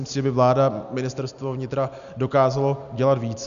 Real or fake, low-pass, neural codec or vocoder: real; 7.2 kHz; none